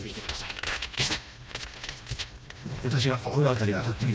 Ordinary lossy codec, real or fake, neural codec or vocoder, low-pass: none; fake; codec, 16 kHz, 1 kbps, FreqCodec, smaller model; none